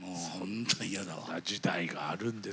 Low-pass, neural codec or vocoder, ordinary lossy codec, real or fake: none; none; none; real